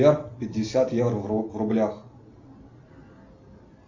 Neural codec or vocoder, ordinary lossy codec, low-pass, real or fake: none; Opus, 64 kbps; 7.2 kHz; real